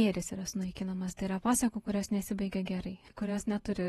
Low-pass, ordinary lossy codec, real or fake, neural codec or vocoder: 19.8 kHz; AAC, 32 kbps; fake; vocoder, 48 kHz, 128 mel bands, Vocos